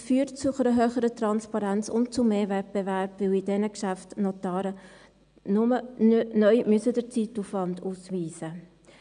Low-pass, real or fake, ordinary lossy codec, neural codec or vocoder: 9.9 kHz; real; none; none